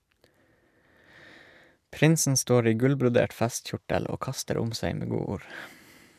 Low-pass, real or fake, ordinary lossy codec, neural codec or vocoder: 14.4 kHz; real; none; none